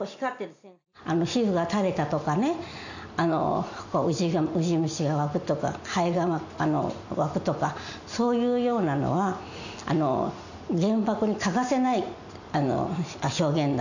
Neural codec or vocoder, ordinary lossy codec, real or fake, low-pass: none; none; real; 7.2 kHz